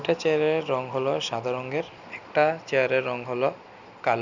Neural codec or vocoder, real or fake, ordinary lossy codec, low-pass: none; real; none; 7.2 kHz